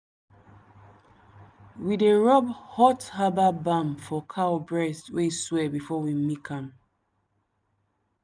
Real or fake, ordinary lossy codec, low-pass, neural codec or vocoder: real; none; none; none